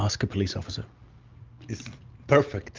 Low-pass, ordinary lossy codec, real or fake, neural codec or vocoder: 7.2 kHz; Opus, 32 kbps; real; none